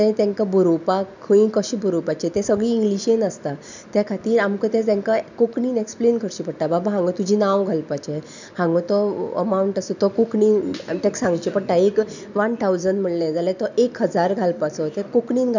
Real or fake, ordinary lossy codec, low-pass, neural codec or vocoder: real; none; 7.2 kHz; none